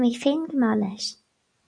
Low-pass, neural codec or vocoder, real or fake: 9.9 kHz; none; real